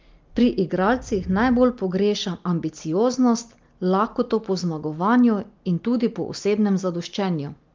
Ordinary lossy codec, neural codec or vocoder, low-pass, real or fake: Opus, 24 kbps; none; 7.2 kHz; real